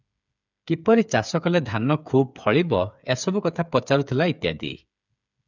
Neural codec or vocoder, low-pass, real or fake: codec, 16 kHz, 8 kbps, FreqCodec, smaller model; 7.2 kHz; fake